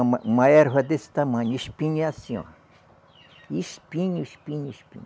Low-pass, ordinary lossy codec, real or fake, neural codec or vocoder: none; none; real; none